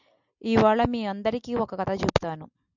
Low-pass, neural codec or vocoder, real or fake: 7.2 kHz; none; real